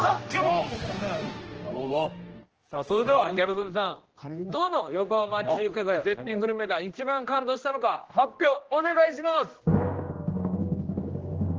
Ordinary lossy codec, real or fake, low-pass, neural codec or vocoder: Opus, 16 kbps; fake; 7.2 kHz; codec, 16 kHz, 1 kbps, X-Codec, HuBERT features, trained on general audio